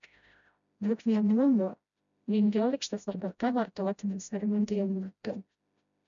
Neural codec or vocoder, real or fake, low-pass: codec, 16 kHz, 0.5 kbps, FreqCodec, smaller model; fake; 7.2 kHz